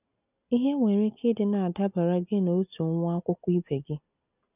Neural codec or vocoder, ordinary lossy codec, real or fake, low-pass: none; none; real; 3.6 kHz